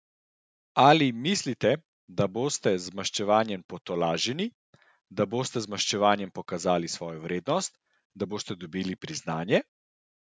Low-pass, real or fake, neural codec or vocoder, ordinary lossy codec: none; real; none; none